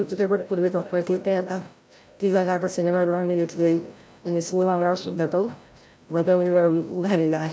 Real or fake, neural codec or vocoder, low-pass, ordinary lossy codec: fake; codec, 16 kHz, 0.5 kbps, FreqCodec, larger model; none; none